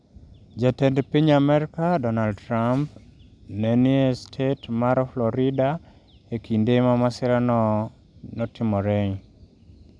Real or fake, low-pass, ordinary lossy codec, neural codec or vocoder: real; 9.9 kHz; none; none